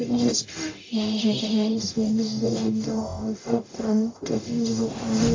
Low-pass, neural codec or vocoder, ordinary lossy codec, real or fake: 7.2 kHz; codec, 44.1 kHz, 0.9 kbps, DAC; AAC, 32 kbps; fake